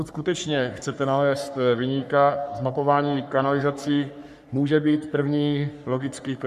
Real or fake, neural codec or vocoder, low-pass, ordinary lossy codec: fake; codec, 44.1 kHz, 3.4 kbps, Pupu-Codec; 14.4 kHz; MP3, 96 kbps